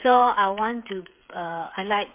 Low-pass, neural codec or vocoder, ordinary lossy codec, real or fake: 3.6 kHz; codec, 16 kHz, 8 kbps, FreqCodec, smaller model; MP3, 32 kbps; fake